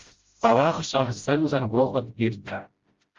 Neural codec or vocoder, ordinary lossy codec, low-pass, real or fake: codec, 16 kHz, 0.5 kbps, FreqCodec, smaller model; Opus, 32 kbps; 7.2 kHz; fake